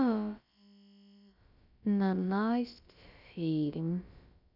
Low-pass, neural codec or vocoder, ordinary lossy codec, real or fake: 5.4 kHz; codec, 16 kHz, about 1 kbps, DyCAST, with the encoder's durations; none; fake